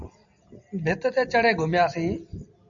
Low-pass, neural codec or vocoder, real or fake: 7.2 kHz; none; real